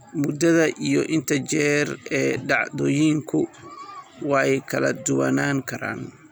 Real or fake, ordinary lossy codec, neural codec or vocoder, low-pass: real; none; none; none